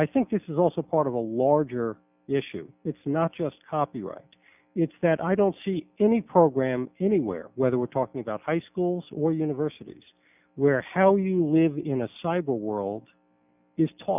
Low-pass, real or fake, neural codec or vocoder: 3.6 kHz; real; none